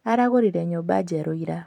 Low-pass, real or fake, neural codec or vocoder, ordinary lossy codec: 19.8 kHz; fake; vocoder, 44.1 kHz, 128 mel bands every 256 samples, BigVGAN v2; none